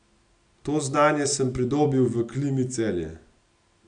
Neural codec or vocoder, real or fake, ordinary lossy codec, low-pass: none; real; AAC, 64 kbps; 9.9 kHz